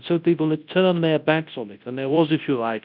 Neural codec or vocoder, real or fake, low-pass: codec, 24 kHz, 0.9 kbps, WavTokenizer, large speech release; fake; 5.4 kHz